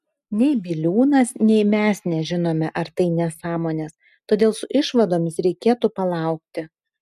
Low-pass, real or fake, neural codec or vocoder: 14.4 kHz; real; none